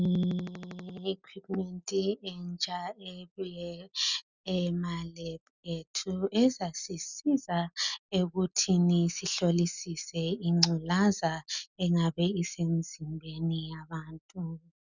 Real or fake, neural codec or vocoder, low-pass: real; none; 7.2 kHz